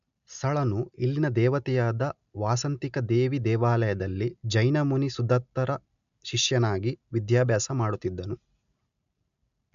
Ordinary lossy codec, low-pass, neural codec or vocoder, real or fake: AAC, 96 kbps; 7.2 kHz; none; real